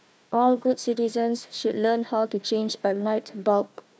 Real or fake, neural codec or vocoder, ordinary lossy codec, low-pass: fake; codec, 16 kHz, 1 kbps, FunCodec, trained on Chinese and English, 50 frames a second; none; none